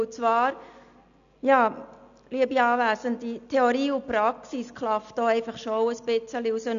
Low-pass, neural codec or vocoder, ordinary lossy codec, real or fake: 7.2 kHz; none; none; real